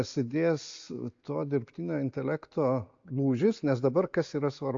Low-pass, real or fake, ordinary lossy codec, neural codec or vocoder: 7.2 kHz; real; AAC, 48 kbps; none